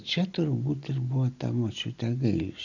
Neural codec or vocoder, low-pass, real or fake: none; 7.2 kHz; real